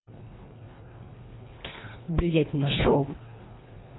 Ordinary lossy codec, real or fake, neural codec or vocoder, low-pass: AAC, 16 kbps; fake; codec, 24 kHz, 1.5 kbps, HILCodec; 7.2 kHz